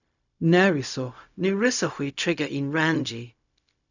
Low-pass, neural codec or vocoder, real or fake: 7.2 kHz; codec, 16 kHz, 0.4 kbps, LongCat-Audio-Codec; fake